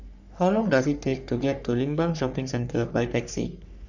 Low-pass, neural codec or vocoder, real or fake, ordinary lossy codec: 7.2 kHz; codec, 44.1 kHz, 3.4 kbps, Pupu-Codec; fake; none